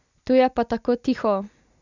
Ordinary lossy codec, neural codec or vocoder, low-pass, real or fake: none; none; 7.2 kHz; real